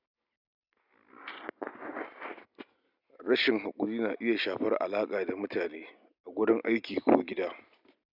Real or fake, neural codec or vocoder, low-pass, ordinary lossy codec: real; none; 5.4 kHz; none